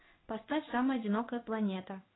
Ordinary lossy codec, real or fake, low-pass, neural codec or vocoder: AAC, 16 kbps; fake; 7.2 kHz; codec, 16 kHz in and 24 kHz out, 1 kbps, XY-Tokenizer